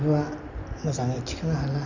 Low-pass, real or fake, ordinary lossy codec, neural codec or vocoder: 7.2 kHz; real; none; none